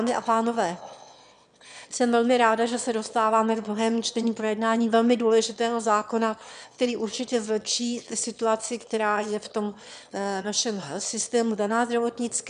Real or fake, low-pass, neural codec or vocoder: fake; 9.9 kHz; autoencoder, 22.05 kHz, a latent of 192 numbers a frame, VITS, trained on one speaker